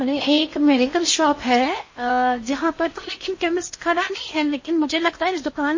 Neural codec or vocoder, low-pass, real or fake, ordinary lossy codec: codec, 16 kHz in and 24 kHz out, 0.6 kbps, FocalCodec, streaming, 4096 codes; 7.2 kHz; fake; MP3, 32 kbps